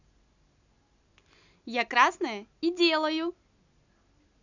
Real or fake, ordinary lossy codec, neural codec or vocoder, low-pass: real; none; none; 7.2 kHz